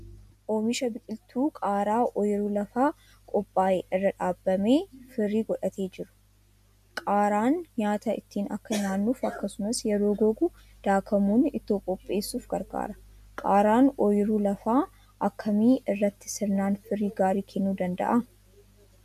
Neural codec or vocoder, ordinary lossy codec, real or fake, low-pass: none; MP3, 96 kbps; real; 14.4 kHz